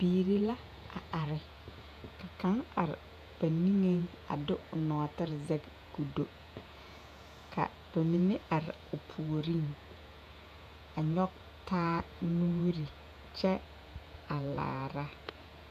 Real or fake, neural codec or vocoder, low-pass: fake; vocoder, 48 kHz, 128 mel bands, Vocos; 14.4 kHz